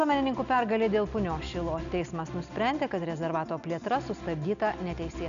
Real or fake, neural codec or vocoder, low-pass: real; none; 7.2 kHz